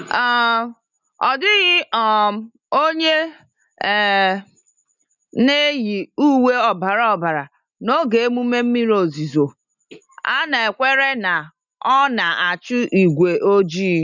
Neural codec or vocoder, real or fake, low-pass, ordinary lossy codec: none; real; 7.2 kHz; none